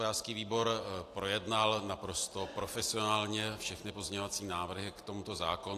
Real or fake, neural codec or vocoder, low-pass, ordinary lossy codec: real; none; 14.4 kHz; AAC, 64 kbps